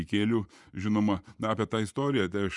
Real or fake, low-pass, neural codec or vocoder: fake; 10.8 kHz; vocoder, 24 kHz, 100 mel bands, Vocos